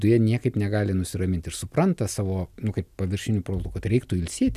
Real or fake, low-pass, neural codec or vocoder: real; 14.4 kHz; none